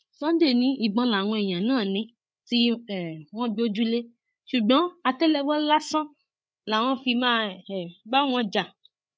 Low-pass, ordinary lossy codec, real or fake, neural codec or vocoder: none; none; fake; codec, 16 kHz, 8 kbps, FreqCodec, larger model